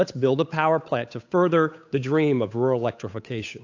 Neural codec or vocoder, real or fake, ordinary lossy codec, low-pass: codec, 16 kHz, 8 kbps, FunCodec, trained on Chinese and English, 25 frames a second; fake; AAC, 48 kbps; 7.2 kHz